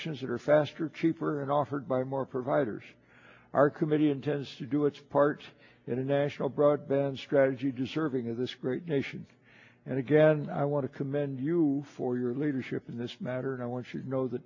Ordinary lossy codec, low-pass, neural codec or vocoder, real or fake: AAC, 48 kbps; 7.2 kHz; none; real